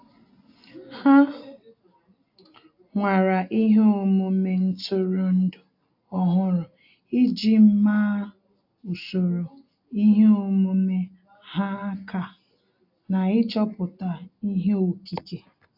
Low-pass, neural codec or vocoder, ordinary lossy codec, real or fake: 5.4 kHz; none; AAC, 48 kbps; real